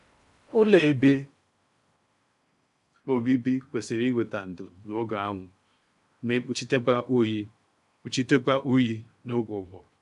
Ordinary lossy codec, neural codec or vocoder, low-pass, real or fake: AAC, 96 kbps; codec, 16 kHz in and 24 kHz out, 0.6 kbps, FocalCodec, streaming, 4096 codes; 10.8 kHz; fake